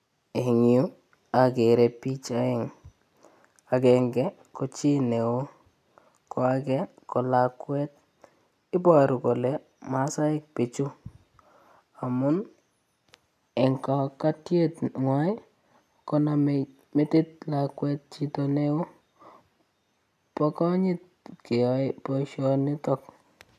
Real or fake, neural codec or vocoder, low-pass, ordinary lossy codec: real; none; 14.4 kHz; none